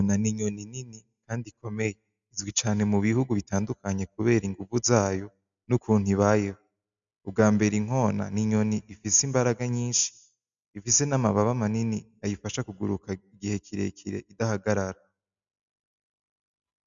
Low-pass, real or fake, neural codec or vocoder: 7.2 kHz; real; none